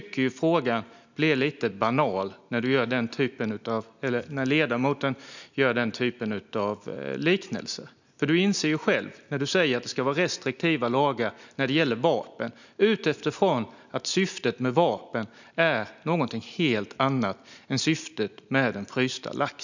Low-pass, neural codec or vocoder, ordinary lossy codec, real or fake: 7.2 kHz; none; none; real